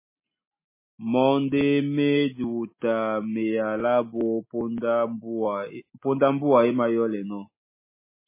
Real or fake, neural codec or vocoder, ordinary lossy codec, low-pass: real; none; MP3, 16 kbps; 3.6 kHz